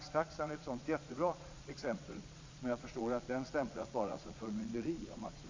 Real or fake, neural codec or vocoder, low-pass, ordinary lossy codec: fake; vocoder, 22.05 kHz, 80 mel bands, Vocos; 7.2 kHz; MP3, 64 kbps